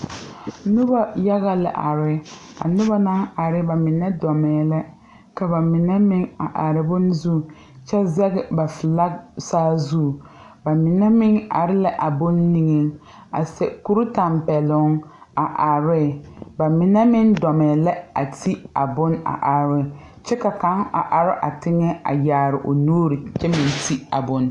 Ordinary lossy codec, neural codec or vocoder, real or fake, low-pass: AAC, 64 kbps; none; real; 10.8 kHz